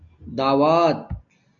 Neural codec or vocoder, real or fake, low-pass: none; real; 7.2 kHz